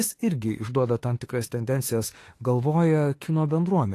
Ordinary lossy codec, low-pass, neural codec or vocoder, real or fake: AAC, 48 kbps; 14.4 kHz; autoencoder, 48 kHz, 32 numbers a frame, DAC-VAE, trained on Japanese speech; fake